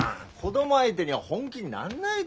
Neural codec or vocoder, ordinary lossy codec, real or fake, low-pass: none; none; real; none